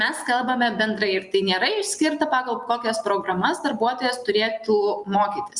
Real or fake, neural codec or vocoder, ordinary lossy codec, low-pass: real; none; Opus, 64 kbps; 10.8 kHz